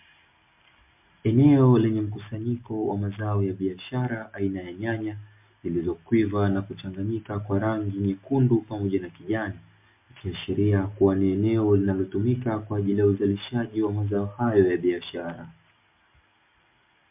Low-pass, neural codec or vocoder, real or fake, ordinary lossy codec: 3.6 kHz; none; real; MP3, 32 kbps